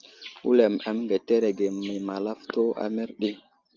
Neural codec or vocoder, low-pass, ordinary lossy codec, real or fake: none; 7.2 kHz; Opus, 24 kbps; real